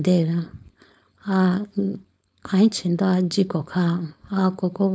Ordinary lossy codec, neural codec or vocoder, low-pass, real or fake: none; codec, 16 kHz, 4.8 kbps, FACodec; none; fake